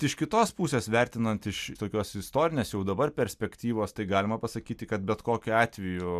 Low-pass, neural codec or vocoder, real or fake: 14.4 kHz; none; real